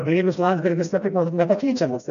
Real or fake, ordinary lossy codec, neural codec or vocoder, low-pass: fake; AAC, 96 kbps; codec, 16 kHz, 1 kbps, FreqCodec, smaller model; 7.2 kHz